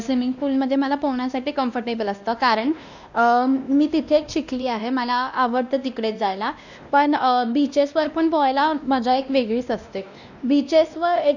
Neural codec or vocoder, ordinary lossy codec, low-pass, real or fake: codec, 16 kHz, 1 kbps, X-Codec, WavLM features, trained on Multilingual LibriSpeech; none; 7.2 kHz; fake